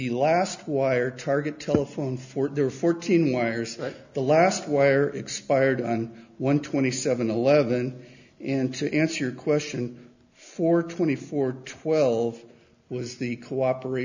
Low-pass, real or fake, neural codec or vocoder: 7.2 kHz; real; none